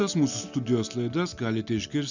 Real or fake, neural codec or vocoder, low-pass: real; none; 7.2 kHz